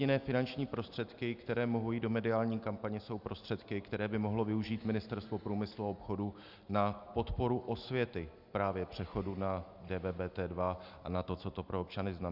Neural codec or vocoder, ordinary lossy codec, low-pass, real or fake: none; Opus, 64 kbps; 5.4 kHz; real